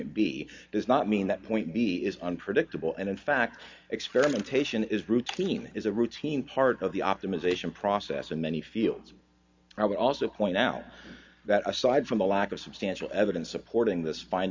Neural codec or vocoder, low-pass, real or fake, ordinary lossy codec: none; 7.2 kHz; real; MP3, 64 kbps